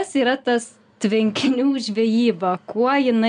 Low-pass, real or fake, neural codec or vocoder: 9.9 kHz; real; none